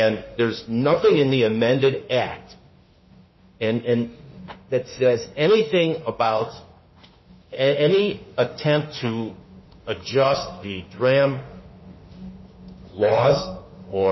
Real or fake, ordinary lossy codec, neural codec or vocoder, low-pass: fake; MP3, 24 kbps; autoencoder, 48 kHz, 32 numbers a frame, DAC-VAE, trained on Japanese speech; 7.2 kHz